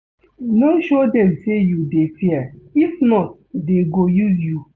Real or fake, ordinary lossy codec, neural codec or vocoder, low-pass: real; none; none; none